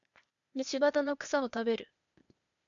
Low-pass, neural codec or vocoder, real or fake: 7.2 kHz; codec, 16 kHz, 0.8 kbps, ZipCodec; fake